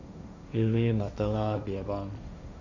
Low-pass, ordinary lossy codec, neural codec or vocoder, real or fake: 7.2 kHz; none; codec, 16 kHz, 1.1 kbps, Voila-Tokenizer; fake